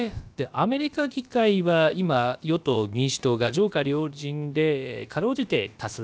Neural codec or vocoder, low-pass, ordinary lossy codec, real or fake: codec, 16 kHz, about 1 kbps, DyCAST, with the encoder's durations; none; none; fake